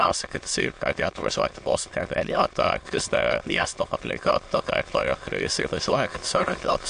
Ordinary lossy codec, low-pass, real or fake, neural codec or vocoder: AAC, 64 kbps; 9.9 kHz; fake; autoencoder, 22.05 kHz, a latent of 192 numbers a frame, VITS, trained on many speakers